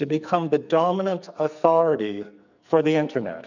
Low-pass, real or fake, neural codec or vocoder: 7.2 kHz; fake; codec, 44.1 kHz, 2.6 kbps, SNAC